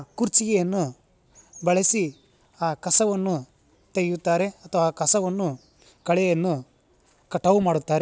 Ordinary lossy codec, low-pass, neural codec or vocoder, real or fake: none; none; none; real